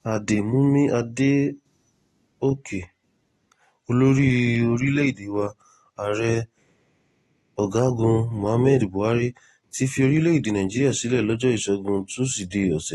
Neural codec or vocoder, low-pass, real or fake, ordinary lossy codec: none; 19.8 kHz; real; AAC, 32 kbps